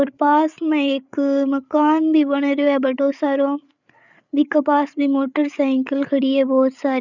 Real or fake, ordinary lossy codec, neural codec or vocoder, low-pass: fake; none; codec, 16 kHz, 8 kbps, FreqCodec, larger model; 7.2 kHz